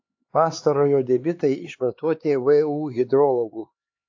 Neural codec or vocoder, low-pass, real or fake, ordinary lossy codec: codec, 16 kHz, 4 kbps, X-Codec, HuBERT features, trained on LibriSpeech; 7.2 kHz; fake; AAC, 48 kbps